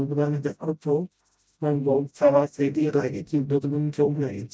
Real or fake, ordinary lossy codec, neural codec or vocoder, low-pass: fake; none; codec, 16 kHz, 0.5 kbps, FreqCodec, smaller model; none